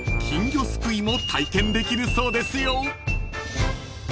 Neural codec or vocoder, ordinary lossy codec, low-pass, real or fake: none; none; none; real